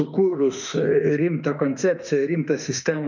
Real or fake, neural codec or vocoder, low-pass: fake; autoencoder, 48 kHz, 32 numbers a frame, DAC-VAE, trained on Japanese speech; 7.2 kHz